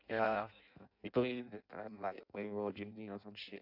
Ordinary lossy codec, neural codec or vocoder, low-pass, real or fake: AAC, 48 kbps; codec, 16 kHz in and 24 kHz out, 0.6 kbps, FireRedTTS-2 codec; 5.4 kHz; fake